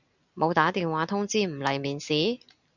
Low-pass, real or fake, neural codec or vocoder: 7.2 kHz; real; none